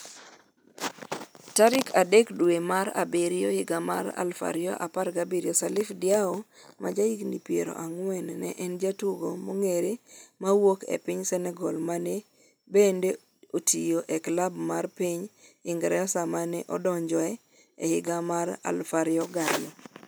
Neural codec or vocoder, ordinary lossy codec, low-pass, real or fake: none; none; none; real